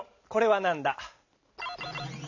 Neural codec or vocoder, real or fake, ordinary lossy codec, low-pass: none; real; none; 7.2 kHz